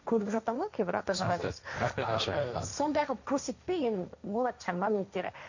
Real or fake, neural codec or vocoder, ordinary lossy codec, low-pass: fake; codec, 16 kHz, 1.1 kbps, Voila-Tokenizer; none; 7.2 kHz